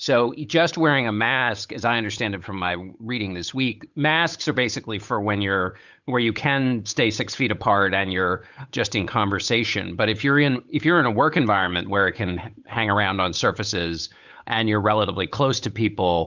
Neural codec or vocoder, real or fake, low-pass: codec, 16 kHz, 8 kbps, FunCodec, trained on Chinese and English, 25 frames a second; fake; 7.2 kHz